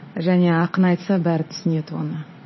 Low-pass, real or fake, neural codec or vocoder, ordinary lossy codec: 7.2 kHz; fake; vocoder, 44.1 kHz, 80 mel bands, Vocos; MP3, 24 kbps